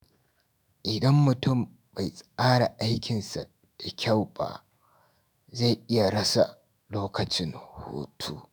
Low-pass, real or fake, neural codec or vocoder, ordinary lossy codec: none; fake; autoencoder, 48 kHz, 128 numbers a frame, DAC-VAE, trained on Japanese speech; none